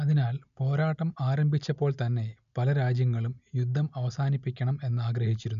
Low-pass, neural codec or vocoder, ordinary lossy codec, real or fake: 7.2 kHz; none; none; real